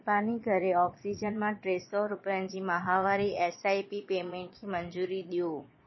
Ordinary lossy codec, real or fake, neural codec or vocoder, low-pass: MP3, 24 kbps; fake; vocoder, 22.05 kHz, 80 mel bands, Vocos; 7.2 kHz